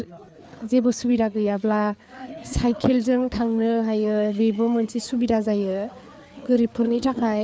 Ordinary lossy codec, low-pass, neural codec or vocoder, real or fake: none; none; codec, 16 kHz, 4 kbps, FreqCodec, larger model; fake